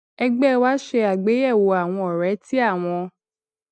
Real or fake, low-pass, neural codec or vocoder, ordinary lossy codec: real; 9.9 kHz; none; none